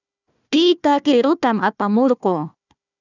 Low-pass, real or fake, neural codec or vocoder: 7.2 kHz; fake; codec, 16 kHz, 1 kbps, FunCodec, trained on Chinese and English, 50 frames a second